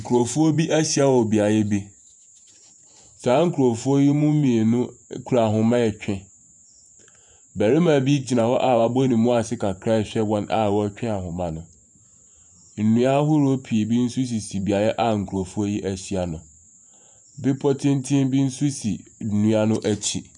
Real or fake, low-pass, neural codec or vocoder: fake; 10.8 kHz; vocoder, 48 kHz, 128 mel bands, Vocos